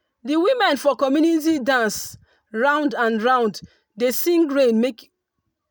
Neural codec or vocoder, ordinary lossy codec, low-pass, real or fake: none; none; none; real